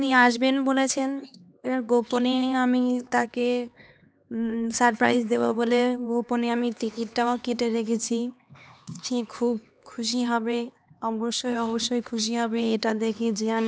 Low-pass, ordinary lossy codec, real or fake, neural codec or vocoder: none; none; fake; codec, 16 kHz, 2 kbps, X-Codec, HuBERT features, trained on LibriSpeech